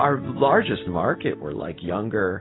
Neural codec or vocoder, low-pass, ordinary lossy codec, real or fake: none; 7.2 kHz; AAC, 16 kbps; real